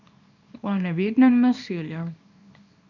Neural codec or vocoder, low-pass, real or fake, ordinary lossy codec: codec, 24 kHz, 0.9 kbps, WavTokenizer, small release; 7.2 kHz; fake; none